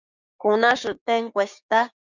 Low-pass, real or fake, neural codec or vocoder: 7.2 kHz; fake; codec, 16 kHz, 6 kbps, DAC